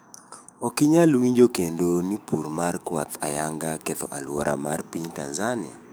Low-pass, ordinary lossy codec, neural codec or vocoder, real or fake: none; none; codec, 44.1 kHz, 7.8 kbps, DAC; fake